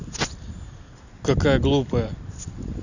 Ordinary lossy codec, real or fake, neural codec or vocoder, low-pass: none; real; none; 7.2 kHz